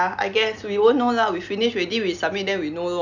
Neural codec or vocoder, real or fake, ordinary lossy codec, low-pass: none; real; none; 7.2 kHz